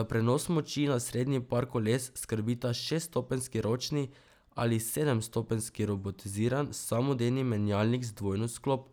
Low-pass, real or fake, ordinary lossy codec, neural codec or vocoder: none; real; none; none